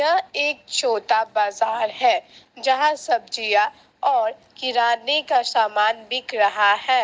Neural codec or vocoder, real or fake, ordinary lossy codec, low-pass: none; real; none; none